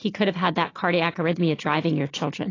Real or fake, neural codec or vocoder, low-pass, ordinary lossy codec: real; none; 7.2 kHz; AAC, 32 kbps